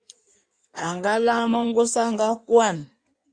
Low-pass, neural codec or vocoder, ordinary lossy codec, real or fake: 9.9 kHz; codec, 16 kHz in and 24 kHz out, 1.1 kbps, FireRedTTS-2 codec; Opus, 64 kbps; fake